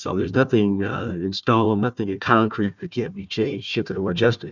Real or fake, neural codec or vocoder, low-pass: fake; codec, 16 kHz, 1 kbps, FunCodec, trained on Chinese and English, 50 frames a second; 7.2 kHz